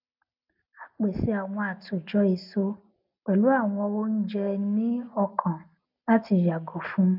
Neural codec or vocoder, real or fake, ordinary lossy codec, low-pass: none; real; none; 5.4 kHz